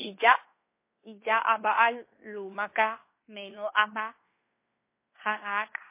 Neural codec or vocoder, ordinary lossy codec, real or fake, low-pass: codec, 16 kHz in and 24 kHz out, 0.9 kbps, LongCat-Audio-Codec, fine tuned four codebook decoder; MP3, 24 kbps; fake; 3.6 kHz